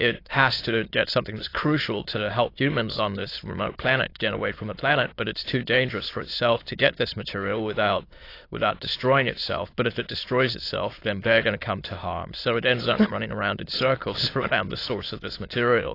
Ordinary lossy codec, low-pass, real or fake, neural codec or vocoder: AAC, 32 kbps; 5.4 kHz; fake; autoencoder, 22.05 kHz, a latent of 192 numbers a frame, VITS, trained on many speakers